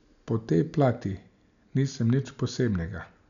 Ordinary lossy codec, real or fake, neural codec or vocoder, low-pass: none; real; none; 7.2 kHz